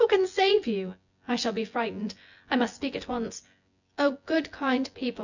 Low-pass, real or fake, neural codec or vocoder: 7.2 kHz; fake; vocoder, 24 kHz, 100 mel bands, Vocos